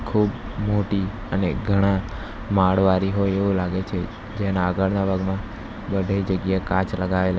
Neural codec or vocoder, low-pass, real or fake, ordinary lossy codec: none; none; real; none